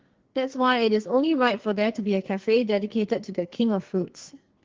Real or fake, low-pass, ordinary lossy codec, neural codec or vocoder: fake; 7.2 kHz; Opus, 16 kbps; codec, 44.1 kHz, 2.6 kbps, SNAC